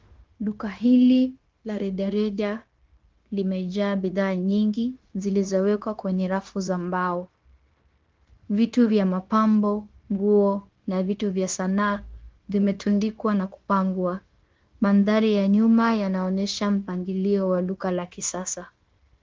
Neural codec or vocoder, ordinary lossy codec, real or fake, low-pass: codec, 16 kHz, 0.9 kbps, LongCat-Audio-Codec; Opus, 16 kbps; fake; 7.2 kHz